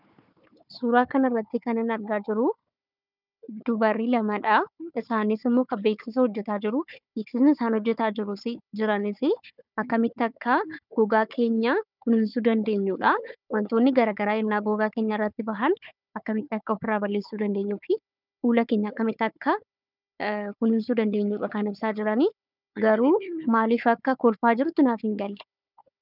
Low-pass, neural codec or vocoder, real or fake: 5.4 kHz; codec, 16 kHz, 4 kbps, FunCodec, trained on Chinese and English, 50 frames a second; fake